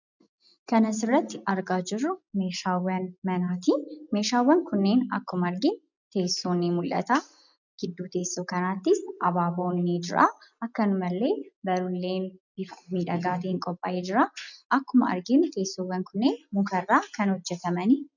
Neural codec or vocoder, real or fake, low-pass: none; real; 7.2 kHz